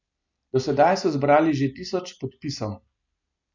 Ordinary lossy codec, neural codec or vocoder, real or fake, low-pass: none; none; real; 7.2 kHz